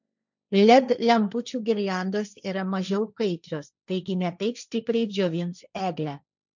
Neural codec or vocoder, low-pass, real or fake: codec, 16 kHz, 1.1 kbps, Voila-Tokenizer; 7.2 kHz; fake